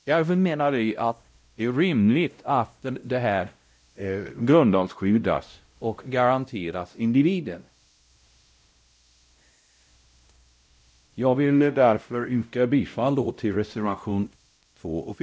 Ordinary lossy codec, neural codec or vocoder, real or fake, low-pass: none; codec, 16 kHz, 0.5 kbps, X-Codec, WavLM features, trained on Multilingual LibriSpeech; fake; none